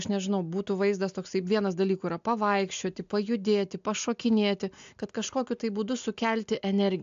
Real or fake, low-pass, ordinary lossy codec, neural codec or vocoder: real; 7.2 kHz; AAC, 64 kbps; none